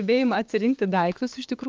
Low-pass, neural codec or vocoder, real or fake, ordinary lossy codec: 7.2 kHz; codec, 16 kHz, 6 kbps, DAC; fake; Opus, 32 kbps